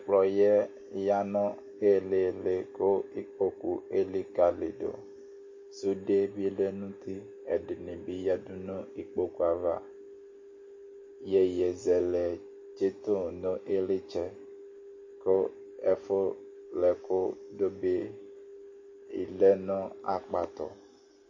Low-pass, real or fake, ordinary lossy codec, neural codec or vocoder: 7.2 kHz; real; MP3, 32 kbps; none